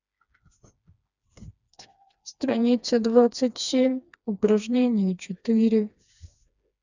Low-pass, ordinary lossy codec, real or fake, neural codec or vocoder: 7.2 kHz; none; fake; codec, 16 kHz, 2 kbps, FreqCodec, smaller model